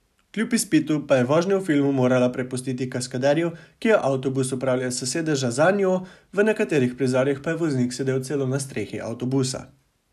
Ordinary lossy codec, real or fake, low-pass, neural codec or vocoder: none; real; 14.4 kHz; none